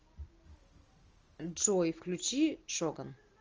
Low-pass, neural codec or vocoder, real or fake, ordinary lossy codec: 7.2 kHz; none; real; Opus, 24 kbps